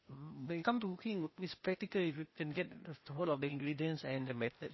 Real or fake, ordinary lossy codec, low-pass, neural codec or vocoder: fake; MP3, 24 kbps; 7.2 kHz; codec, 16 kHz, 0.8 kbps, ZipCodec